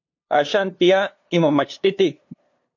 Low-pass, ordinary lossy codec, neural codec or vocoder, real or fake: 7.2 kHz; MP3, 48 kbps; codec, 16 kHz, 2 kbps, FunCodec, trained on LibriTTS, 25 frames a second; fake